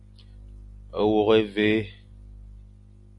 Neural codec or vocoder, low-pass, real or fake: none; 10.8 kHz; real